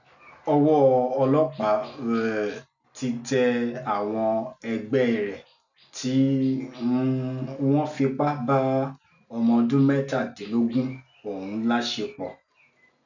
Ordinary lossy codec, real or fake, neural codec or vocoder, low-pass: none; real; none; 7.2 kHz